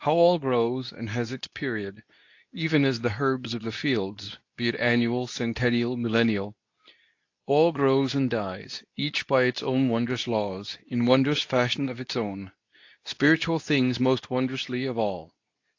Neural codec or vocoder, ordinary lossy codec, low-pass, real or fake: codec, 24 kHz, 0.9 kbps, WavTokenizer, medium speech release version 2; AAC, 48 kbps; 7.2 kHz; fake